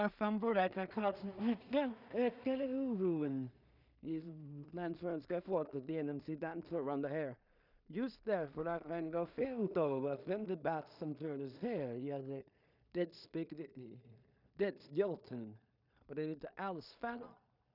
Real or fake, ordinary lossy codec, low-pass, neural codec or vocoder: fake; none; 5.4 kHz; codec, 16 kHz in and 24 kHz out, 0.4 kbps, LongCat-Audio-Codec, two codebook decoder